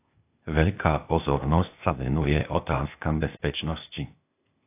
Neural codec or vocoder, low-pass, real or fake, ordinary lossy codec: codec, 16 kHz, 0.8 kbps, ZipCodec; 3.6 kHz; fake; AAC, 32 kbps